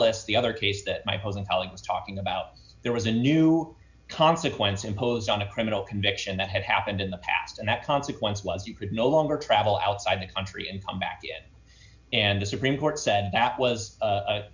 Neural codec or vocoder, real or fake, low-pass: none; real; 7.2 kHz